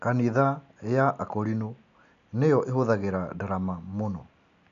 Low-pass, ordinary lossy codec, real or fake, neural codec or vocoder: 7.2 kHz; none; real; none